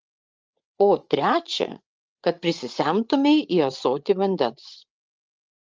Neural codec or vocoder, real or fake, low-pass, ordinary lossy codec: codec, 16 kHz, 8 kbps, FreqCodec, larger model; fake; 7.2 kHz; Opus, 24 kbps